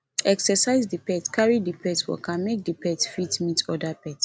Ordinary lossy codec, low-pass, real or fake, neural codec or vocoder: none; none; real; none